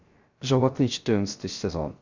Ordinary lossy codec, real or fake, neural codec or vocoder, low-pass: Opus, 64 kbps; fake; codec, 16 kHz, 0.3 kbps, FocalCodec; 7.2 kHz